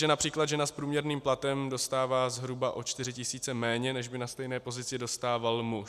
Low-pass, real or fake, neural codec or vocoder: 14.4 kHz; real; none